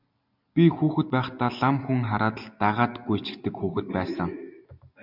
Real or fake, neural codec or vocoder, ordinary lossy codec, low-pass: real; none; MP3, 48 kbps; 5.4 kHz